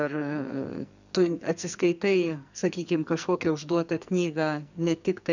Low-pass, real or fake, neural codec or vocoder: 7.2 kHz; fake; codec, 44.1 kHz, 2.6 kbps, SNAC